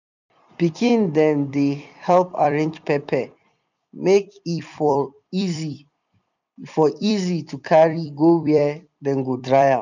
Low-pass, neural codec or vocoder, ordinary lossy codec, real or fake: 7.2 kHz; vocoder, 44.1 kHz, 128 mel bands every 256 samples, BigVGAN v2; none; fake